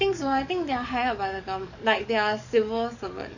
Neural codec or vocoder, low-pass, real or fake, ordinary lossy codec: codec, 44.1 kHz, 7.8 kbps, DAC; 7.2 kHz; fake; none